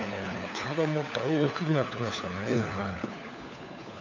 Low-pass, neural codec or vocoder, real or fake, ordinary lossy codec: 7.2 kHz; codec, 16 kHz, 4 kbps, FunCodec, trained on LibriTTS, 50 frames a second; fake; none